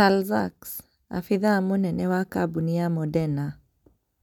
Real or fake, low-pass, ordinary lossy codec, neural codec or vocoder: real; 19.8 kHz; none; none